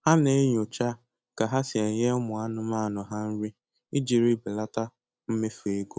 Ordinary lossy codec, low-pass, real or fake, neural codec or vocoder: none; none; real; none